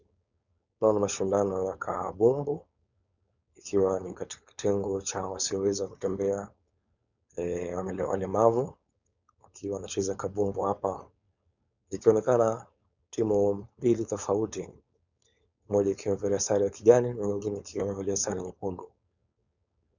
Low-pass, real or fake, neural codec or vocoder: 7.2 kHz; fake; codec, 16 kHz, 4.8 kbps, FACodec